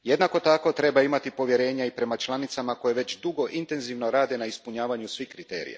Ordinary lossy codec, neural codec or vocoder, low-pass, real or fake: none; none; none; real